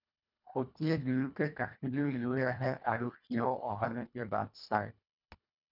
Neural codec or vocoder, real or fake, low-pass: codec, 24 kHz, 1.5 kbps, HILCodec; fake; 5.4 kHz